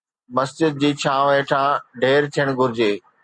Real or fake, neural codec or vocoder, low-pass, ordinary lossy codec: real; none; 9.9 kHz; Opus, 64 kbps